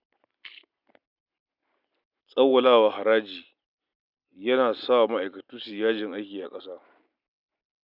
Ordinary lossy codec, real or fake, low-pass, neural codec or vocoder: none; real; 5.4 kHz; none